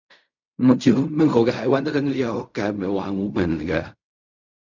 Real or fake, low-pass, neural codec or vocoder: fake; 7.2 kHz; codec, 16 kHz in and 24 kHz out, 0.4 kbps, LongCat-Audio-Codec, fine tuned four codebook decoder